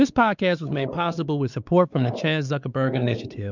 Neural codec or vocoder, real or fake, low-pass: codec, 16 kHz, 4 kbps, X-Codec, WavLM features, trained on Multilingual LibriSpeech; fake; 7.2 kHz